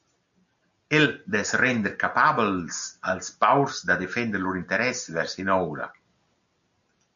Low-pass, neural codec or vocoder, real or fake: 7.2 kHz; none; real